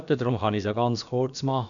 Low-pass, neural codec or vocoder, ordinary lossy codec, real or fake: 7.2 kHz; codec, 16 kHz, about 1 kbps, DyCAST, with the encoder's durations; none; fake